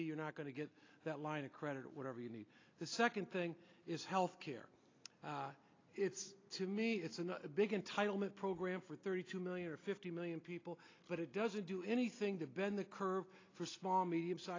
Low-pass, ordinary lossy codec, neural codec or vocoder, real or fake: 7.2 kHz; AAC, 32 kbps; none; real